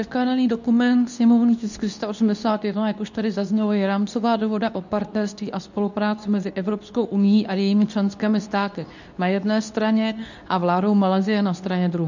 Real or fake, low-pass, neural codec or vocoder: fake; 7.2 kHz; codec, 24 kHz, 0.9 kbps, WavTokenizer, medium speech release version 2